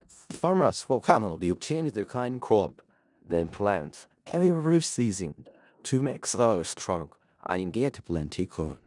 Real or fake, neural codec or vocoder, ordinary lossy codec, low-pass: fake; codec, 16 kHz in and 24 kHz out, 0.4 kbps, LongCat-Audio-Codec, four codebook decoder; none; 10.8 kHz